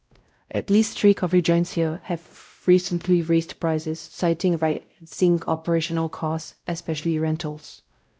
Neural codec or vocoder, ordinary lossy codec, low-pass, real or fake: codec, 16 kHz, 0.5 kbps, X-Codec, WavLM features, trained on Multilingual LibriSpeech; none; none; fake